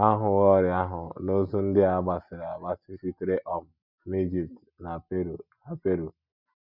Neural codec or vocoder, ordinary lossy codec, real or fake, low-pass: none; none; real; 5.4 kHz